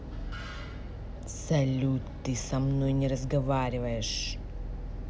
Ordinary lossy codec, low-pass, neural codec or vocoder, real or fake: none; none; none; real